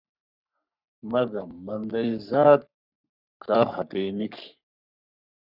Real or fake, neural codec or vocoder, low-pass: fake; codec, 44.1 kHz, 3.4 kbps, Pupu-Codec; 5.4 kHz